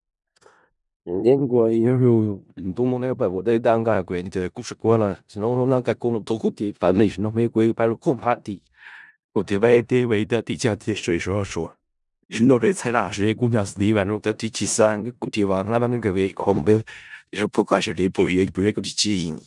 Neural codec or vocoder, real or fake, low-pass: codec, 16 kHz in and 24 kHz out, 0.4 kbps, LongCat-Audio-Codec, four codebook decoder; fake; 10.8 kHz